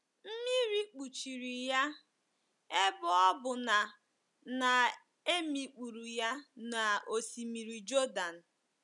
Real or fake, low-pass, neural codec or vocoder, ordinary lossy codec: real; 10.8 kHz; none; none